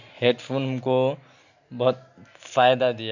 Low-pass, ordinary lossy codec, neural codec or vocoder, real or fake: 7.2 kHz; none; none; real